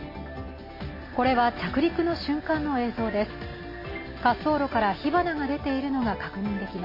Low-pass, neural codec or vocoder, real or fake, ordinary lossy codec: 5.4 kHz; none; real; MP3, 24 kbps